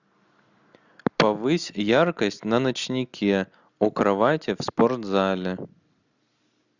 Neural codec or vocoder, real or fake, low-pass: none; real; 7.2 kHz